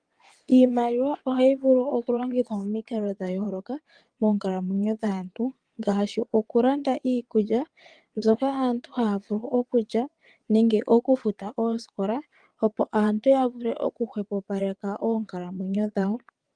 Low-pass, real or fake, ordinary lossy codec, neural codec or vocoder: 9.9 kHz; fake; Opus, 24 kbps; vocoder, 22.05 kHz, 80 mel bands, WaveNeXt